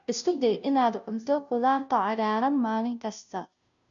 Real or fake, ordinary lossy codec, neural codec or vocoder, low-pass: fake; none; codec, 16 kHz, 0.5 kbps, FunCodec, trained on Chinese and English, 25 frames a second; 7.2 kHz